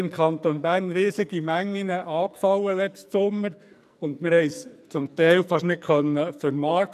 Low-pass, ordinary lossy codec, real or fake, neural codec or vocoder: 14.4 kHz; none; fake; codec, 44.1 kHz, 2.6 kbps, SNAC